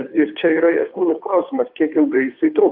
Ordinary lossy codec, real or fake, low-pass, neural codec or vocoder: AAC, 48 kbps; fake; 5.4 kHz; codec, 16 kHz, 2 kbps, FunCodec, trained on Chinese and English, 25 frames a second